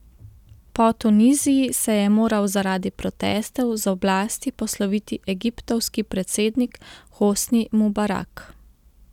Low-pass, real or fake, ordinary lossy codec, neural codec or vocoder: 19.8 kHz; real; none; none